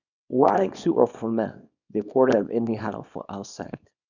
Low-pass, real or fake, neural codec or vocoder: 7.2 kHz; fake; codec, 24 kHz, 0.9 kbps, WavTokenizer, small release